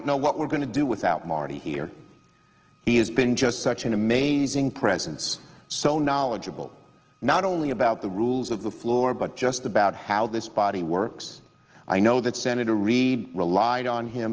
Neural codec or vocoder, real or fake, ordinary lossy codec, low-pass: none; real; Opus, 16 kbps; 7.2 kHz